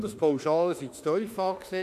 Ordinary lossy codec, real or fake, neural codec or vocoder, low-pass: AAC, 96 kbps; fake; autoencoder, 48 kHz, 32 numbers a frame, DAC-VAE, trained on Japanese speech; 14.4 kHz